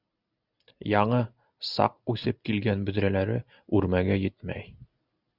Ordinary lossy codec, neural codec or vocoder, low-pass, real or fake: Opus, 64 kbps; none; 5.4 kHz; real